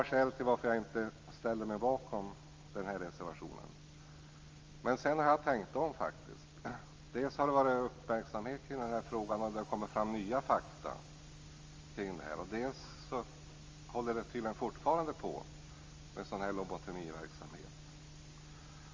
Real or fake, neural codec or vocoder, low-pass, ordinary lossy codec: real; none; 7.2 kHz; Opus, 32 kbps